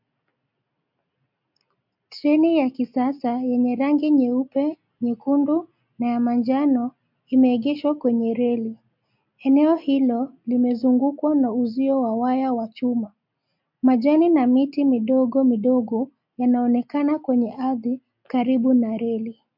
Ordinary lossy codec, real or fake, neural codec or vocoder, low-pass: MP3, 48 kbps; real; none; 5.4 kHz